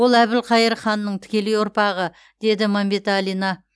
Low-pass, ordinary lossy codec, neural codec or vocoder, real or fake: none; none; none; real